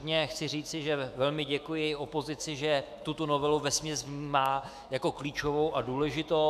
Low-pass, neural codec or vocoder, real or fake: 14.4 kHz; autoencoder, 48 kHz, 128 numbers a frame, DAC-VAE, trained on Japanese speech; fake